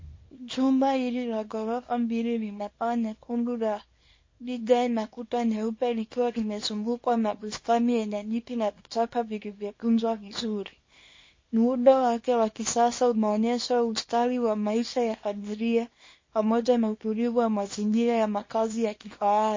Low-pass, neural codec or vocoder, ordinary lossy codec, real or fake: 7.2 kHz; codec, 24 kHz, 0.9 kbps, WavTokenizer, small release; MP3, 32 kbps; fake